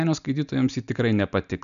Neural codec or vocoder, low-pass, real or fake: none; 7.2 kHz; real